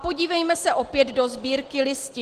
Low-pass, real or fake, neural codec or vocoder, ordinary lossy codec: 14.4 kHz; real; none; Opus, 16 kbps